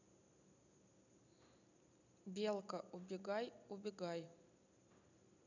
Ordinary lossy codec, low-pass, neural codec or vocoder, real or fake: none; 7.2 kHz; none; real